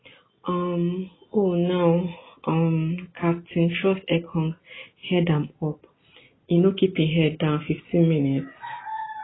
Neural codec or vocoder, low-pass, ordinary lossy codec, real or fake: none; 7.2 kHz; AAC, 16 kbps; real